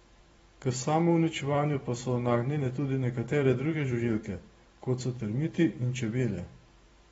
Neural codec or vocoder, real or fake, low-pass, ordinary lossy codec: vocoder, 44.1 kHz, 128 mel bands every 512 samples, BigVGAN v2; fake; 19.8 kHz; AAC, 24 kbps